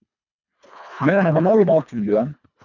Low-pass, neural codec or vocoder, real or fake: 7.2 kHz; codec, 24 kHz, 1.5 kbps, HILCodec; fake